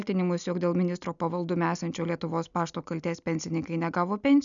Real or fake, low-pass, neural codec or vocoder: real; 7.2 kHz; none